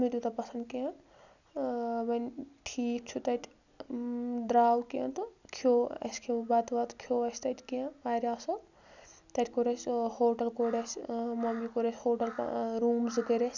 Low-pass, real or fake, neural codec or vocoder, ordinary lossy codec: 7.2 kHz; real; none; none